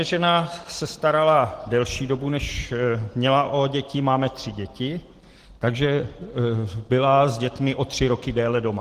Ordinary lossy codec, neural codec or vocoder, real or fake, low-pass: Opus, 16 kbps; none; real; 14.4 kHz